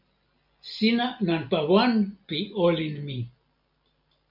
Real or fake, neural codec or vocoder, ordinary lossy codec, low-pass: real; none; MP3, 48 kbps; 5.4 kHz